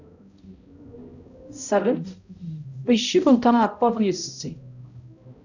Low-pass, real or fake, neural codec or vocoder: 7.2 kHz; fake; codec, 16 kHz, 0.5 kbps, X-Codec, HuBERT features, trained on balanced general audio